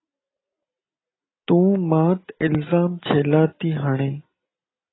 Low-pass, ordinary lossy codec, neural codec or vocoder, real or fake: 7.2 kHz; AAC, 16 kbps; none; real